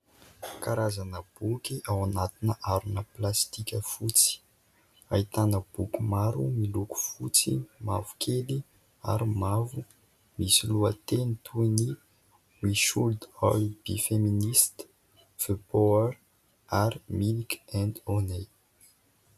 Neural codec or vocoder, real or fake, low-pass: none; real; 14.4 kHz